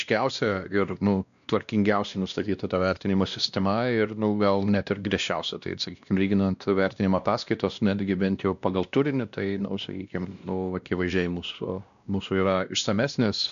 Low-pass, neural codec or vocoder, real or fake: 7.2 kHz; codec, 16 kHz, 1 kbps, X-Codec, WavLM features, trained on Multilingual LibriSpeech; fake